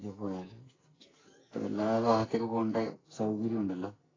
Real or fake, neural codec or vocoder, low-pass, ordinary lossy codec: fake; codec, 44.1 kHz, 2.6 kbps, SNAC; 7.2 kHz; AAC, 32 kbps